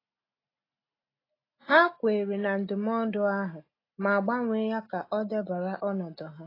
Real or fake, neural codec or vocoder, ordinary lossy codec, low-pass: real; none; AAC, 24 kbps; 5.4 kHz